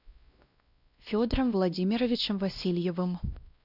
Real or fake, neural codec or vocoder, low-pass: fake; codec, 16 kHz, 1 kbps, X-Codec, WavLM features, trained on Multilingual LibriSpeech; 5.4 kHz